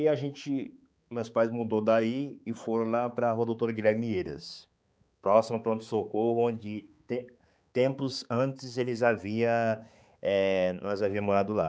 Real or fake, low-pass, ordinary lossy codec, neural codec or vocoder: fake; none; none; codec, 16 kHz, 4 kbps, X-Codec, HuBERT features, trained on balanced general audio